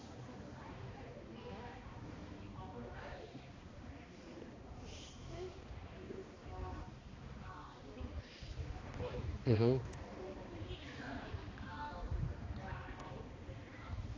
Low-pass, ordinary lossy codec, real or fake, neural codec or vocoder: 7.2 kHz; AAC, 32 kbps; fake; codec, 16 kHz, 2 kbps, X-Codec, HuBERT features, trained on balanced general audio